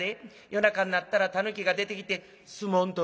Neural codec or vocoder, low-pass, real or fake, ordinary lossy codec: none; none; real; none